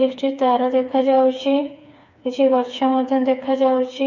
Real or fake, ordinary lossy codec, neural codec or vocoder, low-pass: fake; none; codec, 16 kHz, 4 kbps, FreqCodec, smaller model; 7.2 kHz